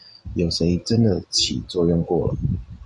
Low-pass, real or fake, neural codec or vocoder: 10.8 kHz; real; none